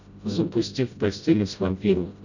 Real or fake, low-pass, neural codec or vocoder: fake; 7.2 kHz; codec, 16 kHz, 0.5 kbps, FreqCodec, smaller model